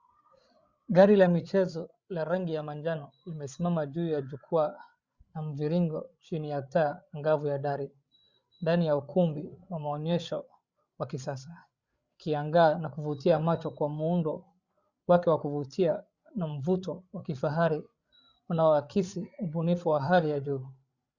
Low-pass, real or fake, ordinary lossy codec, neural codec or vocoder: 7.2 kHz; fake; Opus, 64 kbps; codec, 16 kHz, 8 kbps, FreqCodec, larger model